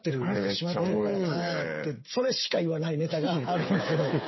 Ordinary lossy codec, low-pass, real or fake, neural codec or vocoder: MP3, 24 kbps; 7.2 kHz; fake; codec, 16 kHz, 8 kbps, FreqCodec, smaller model